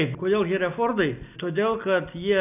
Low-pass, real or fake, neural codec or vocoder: 3.6 kHz; real; none